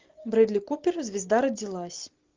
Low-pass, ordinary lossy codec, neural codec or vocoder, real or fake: 7.2 kHz; Opus, 24 kbps; none; real